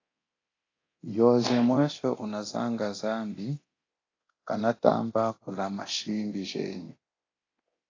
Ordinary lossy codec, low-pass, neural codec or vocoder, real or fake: AAC, 32 kbps; 7.2 kHz; codec, 24 kHz, 0.9 kbps, DualCodec; fake